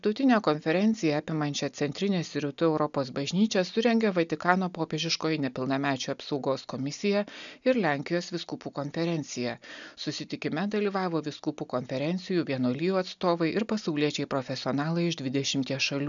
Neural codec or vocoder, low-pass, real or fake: none; 7.2 kHz; real